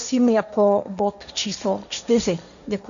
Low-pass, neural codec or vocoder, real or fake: 7.2 kHz; codec, 16 kHz, 1.1 kbps, Voila-Tokenizer; fake